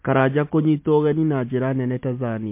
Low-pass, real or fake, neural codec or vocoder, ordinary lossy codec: 3.6 kHz; real; none; MP3, 24 kbps